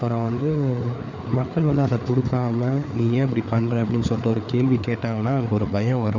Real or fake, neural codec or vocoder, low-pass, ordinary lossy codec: fake; codec, 16 kHz, 4 kbps, FreqCodec, larger model; 7.2 kHz; none